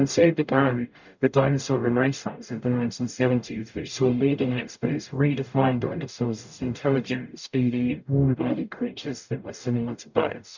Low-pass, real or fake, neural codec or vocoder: 7.2 kHz; fake; codec, 44.1 kHz, 0.9 kbps, DAC